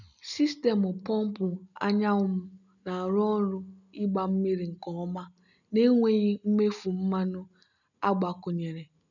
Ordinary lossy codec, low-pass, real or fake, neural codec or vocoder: none; 7.2 kHz; real; none